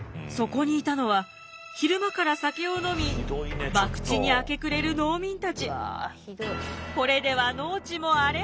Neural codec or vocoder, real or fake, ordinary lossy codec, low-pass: none; real; none; none